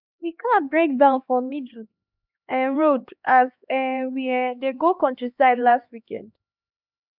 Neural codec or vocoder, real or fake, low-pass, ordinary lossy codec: codec, 16 kHz, 2 kbps, X-Codec, HuBERT features, trained on LibriSpeech; fake; 5.4 kHz; none